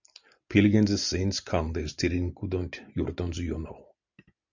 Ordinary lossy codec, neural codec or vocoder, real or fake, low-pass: Opus, 64 kbps; none; real; 7.2 kHz